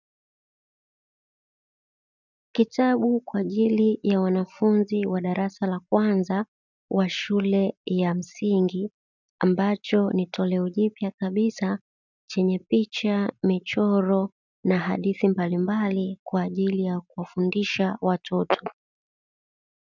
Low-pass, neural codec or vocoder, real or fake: 7.2 kHz; none; real